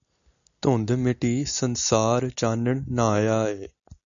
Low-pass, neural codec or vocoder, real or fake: 7.2 kHz; none; real